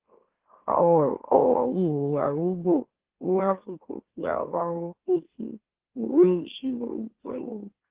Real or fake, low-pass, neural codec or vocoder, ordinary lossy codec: fake; 3.6 kHz; autoencoder, 44.1 kHz, a latent of 192 numbers a frame, MeloTTS; Opus, 16 kbps